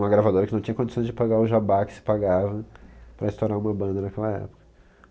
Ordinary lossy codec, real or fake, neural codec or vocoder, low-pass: none; real; none; none